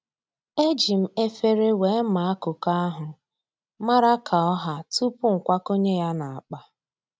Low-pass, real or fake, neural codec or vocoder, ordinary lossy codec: none; real; none; none